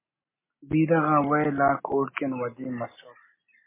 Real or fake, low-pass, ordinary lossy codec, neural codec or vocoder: real; 3.6 kHz; MP3, 16 kbps; none